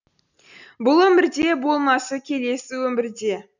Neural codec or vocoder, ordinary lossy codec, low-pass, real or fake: none; none; 7.2 kHz; real